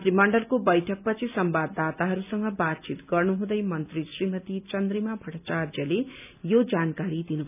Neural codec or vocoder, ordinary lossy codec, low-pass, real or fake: none; none; 3.6 kHz; real